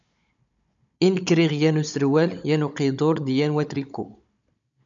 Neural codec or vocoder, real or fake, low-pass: codec, 16 kHz, 16 kbps, FunCodec, trained on Chinese and English, 50 frames a second; fake; 7.2 kHz